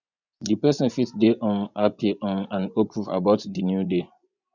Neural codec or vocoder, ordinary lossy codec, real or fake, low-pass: vocoder, 24 kHz, 100 mel bands, Vocos; none; fake; 7.2 kHz